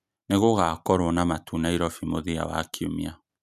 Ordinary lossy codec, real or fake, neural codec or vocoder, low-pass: none; real; none; 14.4 kHz